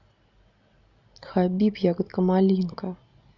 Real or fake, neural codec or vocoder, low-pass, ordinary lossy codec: real; none; 7.2 kHz; none